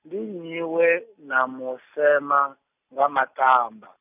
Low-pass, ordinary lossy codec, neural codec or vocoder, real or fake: 3.6 kHz; none; none; real